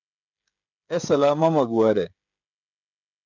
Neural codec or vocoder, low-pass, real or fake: codec, 16 kHz, 16 kbps, FreqCodec, smaller model; 7.2 kHz; fake